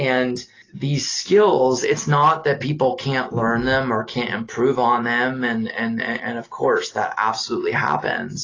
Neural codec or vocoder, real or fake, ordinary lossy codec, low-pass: none; real; AAC, 32 kbps; 7.2 kHz